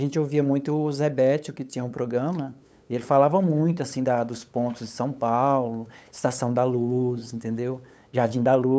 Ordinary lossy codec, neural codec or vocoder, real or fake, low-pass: none; codec, 16 kHz, 8 kbps, FunCodec, trained on LibriTTS, 25 frames a second; fake; none